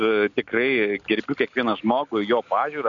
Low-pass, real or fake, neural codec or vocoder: 7.2 kHz; real; none